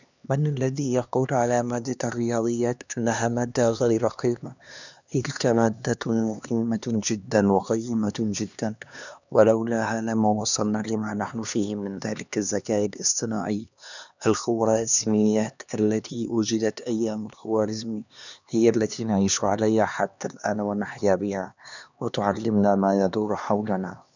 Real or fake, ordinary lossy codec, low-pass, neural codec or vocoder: fake; none; 7.2 kHz; codec, 16 kHz, 2 kbps, X-Codec, HuBERT features, trained on LibriSpeech